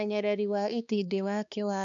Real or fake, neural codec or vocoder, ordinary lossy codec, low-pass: fake; codec, 16 kHz, 2 kbps, X-Codec, HuBERT features, trained on balanced general audio; none; 7.2 kHz